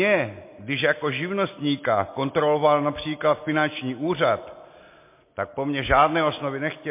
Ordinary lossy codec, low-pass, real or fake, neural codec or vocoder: MP3, 24 kbps; 3.6 kHz; real; none